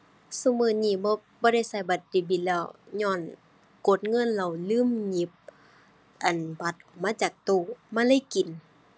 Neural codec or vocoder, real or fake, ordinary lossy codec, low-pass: none; real; none; none